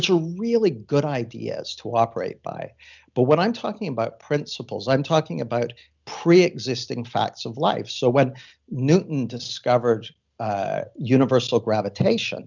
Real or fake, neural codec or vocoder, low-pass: real; none; 7.2 kHz